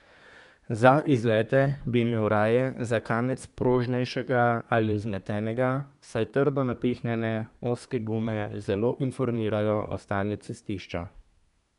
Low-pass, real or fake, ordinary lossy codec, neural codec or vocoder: 10.8 kHz; fake; none; codec, 24 kHz, 1 kbps, SNAC